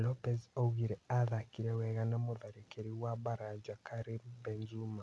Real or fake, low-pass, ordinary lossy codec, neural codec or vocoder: real; none; none; none